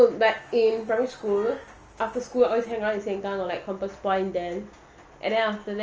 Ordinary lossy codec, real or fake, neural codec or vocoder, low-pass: Opus, 24 kbps; real; none; 7.2 kHz